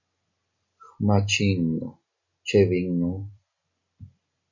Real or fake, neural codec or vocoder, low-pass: real; none; 7.2 kHz